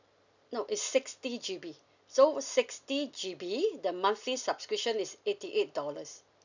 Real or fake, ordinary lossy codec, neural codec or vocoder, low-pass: real; none; none; 7.2 kHz